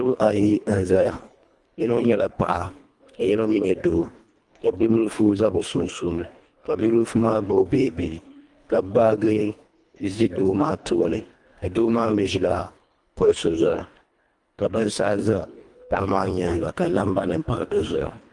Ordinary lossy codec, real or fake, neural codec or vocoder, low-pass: Opus, 32 kbps; fake; codec, 24 kHz, 1.5 kbps, HILCodec; 10.8 kHz